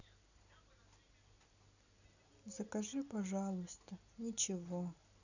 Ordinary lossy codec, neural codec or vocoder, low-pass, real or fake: none; none; 7.2 kHz; real